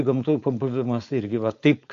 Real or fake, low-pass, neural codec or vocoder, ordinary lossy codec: fake; 7.2 kHz; codec, 16 kHz, 4.8 kbps, FACodec; AAC, 64 kbps